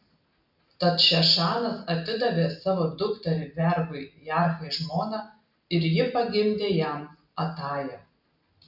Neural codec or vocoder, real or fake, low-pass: none; real; 5.4 kHz